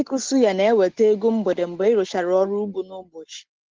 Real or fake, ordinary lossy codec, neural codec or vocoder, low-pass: real; Opus, 16 kbps; none; 7.2 kHz